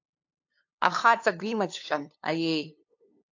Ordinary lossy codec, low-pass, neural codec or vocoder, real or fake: AAC, 48 kbps; 7.2 kHz; codec, 16 kHz, 2 kbps, FunCodec, trained on LibriTTS, 25 frames a second; fake